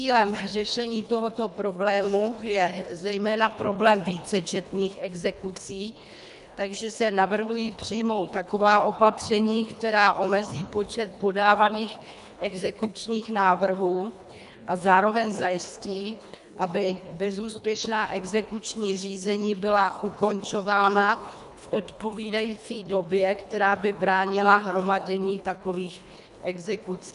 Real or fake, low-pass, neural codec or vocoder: fake; 10.8 kHz; codec, 24 kHz, 1.5 kbps, HILCodec